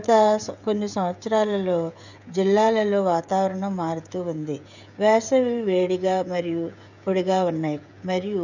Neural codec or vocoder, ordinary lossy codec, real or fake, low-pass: codec, 16 kHz, 16 kbps, FreqCodec, smaller model; none; fake; 7.2 kHz